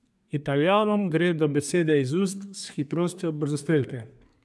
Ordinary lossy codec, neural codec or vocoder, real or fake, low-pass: none; codec, 24 kHz, 1 kbps, SNAC; fake; none